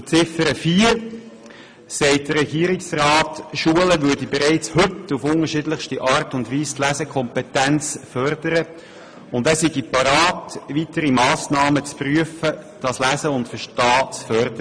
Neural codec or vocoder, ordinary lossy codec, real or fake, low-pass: none; MP3, 64 kbps; real; 9.9 kHz